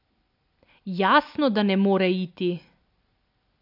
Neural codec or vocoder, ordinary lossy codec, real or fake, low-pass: none; AAC, 48 kbps; real; 5.4 kHz